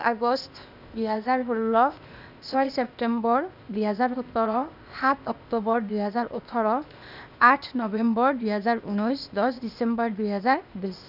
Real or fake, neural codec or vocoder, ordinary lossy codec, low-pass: fake; codec, 16 kHz, 0.8 kbps, ZipCodec; none; 5.4 kHz